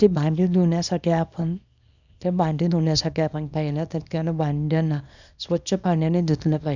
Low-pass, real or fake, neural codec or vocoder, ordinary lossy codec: 7.2 kHz; fake; codec, 24 kHz, 0.9 kbps, WavTokenizer, small release; none